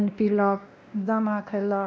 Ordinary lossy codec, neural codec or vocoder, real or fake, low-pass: none; codec, 16 kHz, 1 kbps, X-Codec, WavLM features, trained on Multilingual LibriSpeech; fake; none